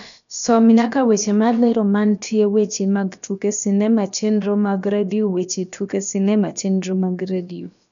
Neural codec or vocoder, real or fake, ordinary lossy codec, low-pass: codec, 16 kHz, about 1 kbps, DyCAST, with the encoder's durations; fake; none; 7.2 kHz